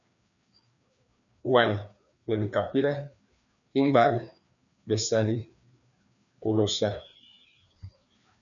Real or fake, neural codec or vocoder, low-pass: fake; codec, 16 kHz, 2 kbps, FreqCodec, larger model; 7.2 kHz